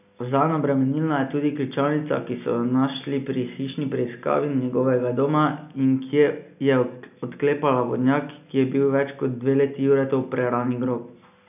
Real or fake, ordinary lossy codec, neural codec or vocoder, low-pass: real; none; none; 3.6 kHz